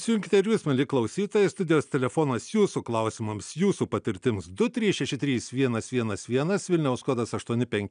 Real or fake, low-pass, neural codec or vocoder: real; 9.9 kHz; none